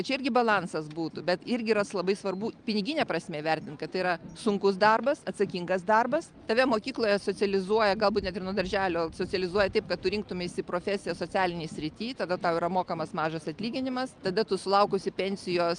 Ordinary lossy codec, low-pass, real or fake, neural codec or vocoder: Opus, 32 kbps; 9.9 kHz; real; none